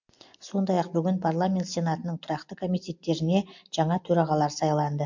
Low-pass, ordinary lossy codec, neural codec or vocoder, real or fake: 7.2 kHz; MP3, 48 kbps; none; real